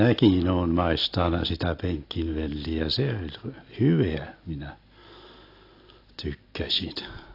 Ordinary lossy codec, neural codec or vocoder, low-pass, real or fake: AAC, 32 kbps; none; 5.4 kHz; real